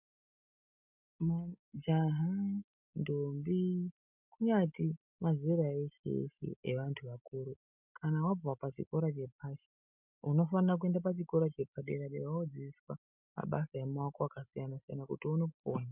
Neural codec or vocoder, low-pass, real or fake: none; 3.6 kHz; real